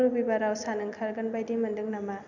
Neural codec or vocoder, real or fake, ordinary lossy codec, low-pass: none; real; none; 7.2 kHz